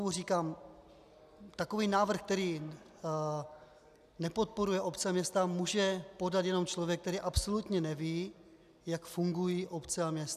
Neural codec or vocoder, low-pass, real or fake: none; 14.4 kHz; real